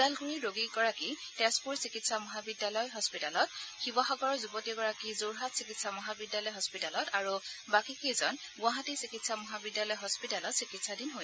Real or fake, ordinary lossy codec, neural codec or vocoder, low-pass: real; none; none; none